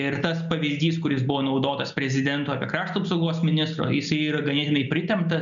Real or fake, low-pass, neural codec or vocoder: real; 7.2 kHz; none